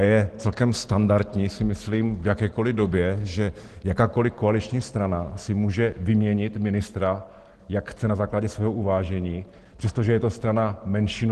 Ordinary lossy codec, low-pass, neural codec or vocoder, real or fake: Opus, 16 kbps; 10.8 kHz; none; real